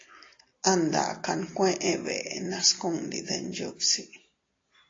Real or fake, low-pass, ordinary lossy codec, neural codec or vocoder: real; 7.2 kHz; AAC, 32 kbps; none